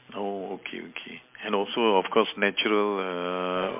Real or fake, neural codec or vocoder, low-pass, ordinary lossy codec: real; none; 3.6 kHz; MP3, 32 kbps